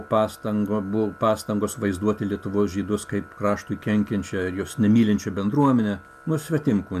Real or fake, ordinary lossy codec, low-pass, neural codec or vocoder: real; AAC, 96 kbps; 14.4 kHz; none